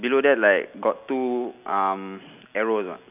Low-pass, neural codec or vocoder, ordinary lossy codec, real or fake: 3.6 kHz; none; none; real